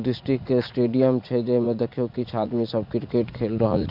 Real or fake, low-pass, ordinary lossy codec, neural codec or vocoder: fake; 5.4 kHz; none; vocoder, 44.1 kHz, 80 mel bands, Vocos